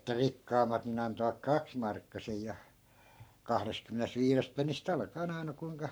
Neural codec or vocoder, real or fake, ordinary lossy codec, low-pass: codec, 44.1 kHz, 7.8 kbps, Pupu-Codec; fake; none; none